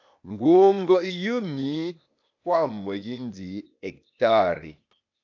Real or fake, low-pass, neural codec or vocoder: fake; 7.2 kHz; codec, 16 kHz, 0.8 kbps, ZipCodec